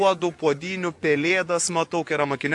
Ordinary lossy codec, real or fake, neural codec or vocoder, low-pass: AAC, 64 kbps; fake; vocoder, 24 kHz, 100 mel bands, Vocos; 10.8 kHz